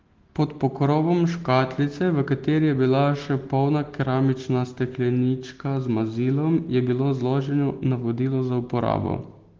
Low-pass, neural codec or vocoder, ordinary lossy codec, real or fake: 7.2 kHz; none; Opus, 16 kbps; real